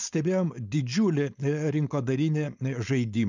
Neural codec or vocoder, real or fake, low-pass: codec, 16 kHz, 4.8 kbps, FACodec; fake; 7.2 kHz